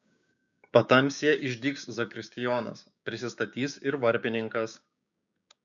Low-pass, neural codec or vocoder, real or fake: 7.2 kHz; codec, 16 kHz, 6 kbps, DAC; fake